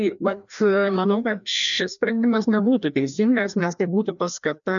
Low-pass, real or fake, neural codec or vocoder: 7.2 kHz; fake; codec, 16 kHz, 1 kbps, FreqCodec, larger model